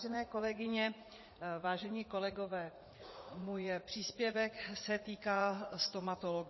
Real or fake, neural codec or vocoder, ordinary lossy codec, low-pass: fake; vocoder, 44.1 kHz, 80 mel bands, Vocos; MP3, 24 kbps; 7.2 kHz